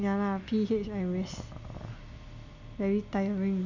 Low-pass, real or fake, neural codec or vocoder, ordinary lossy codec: 7.2 kHz; real; none; none